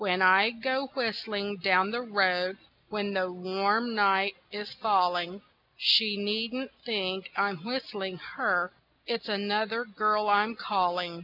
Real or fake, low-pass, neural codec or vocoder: real; 5.4 kHz; none